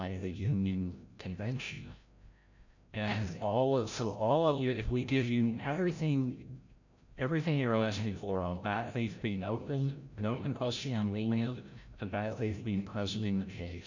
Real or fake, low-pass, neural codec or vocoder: fake; 7.2 kHz; codec, 16 kHz, 0.5 kbps, FreqCodec, larger model